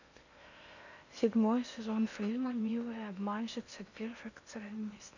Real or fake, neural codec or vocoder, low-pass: fake; codec, 16 kHz in and 24 kHz out, 0.8 kbps, FocalCodec, streaming, 65536 codes; 7.2 kHz